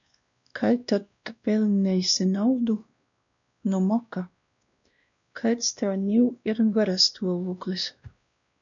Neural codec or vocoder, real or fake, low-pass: codec, 16 kHz, 1 kbps, X-Codec, WavLM features, trained on Multilingual LibriSpeech; fake; 7.2 kHz